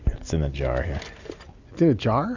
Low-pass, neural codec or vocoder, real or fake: 7.2 kHz; none; real